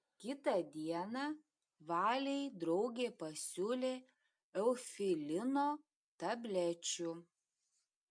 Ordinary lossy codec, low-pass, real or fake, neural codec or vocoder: MP3, 64 kbps; 9.9 kHz; real; none